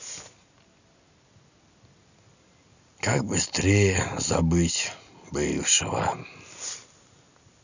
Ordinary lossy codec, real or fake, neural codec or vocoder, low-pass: none; real; none; 7.2 kHz